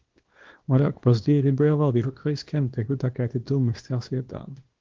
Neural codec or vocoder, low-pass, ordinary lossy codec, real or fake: codec, 24 kHz, 0.9 kbps, WavTokenizer, small release; 7.2 kHz; Opus, 16 kbps; fake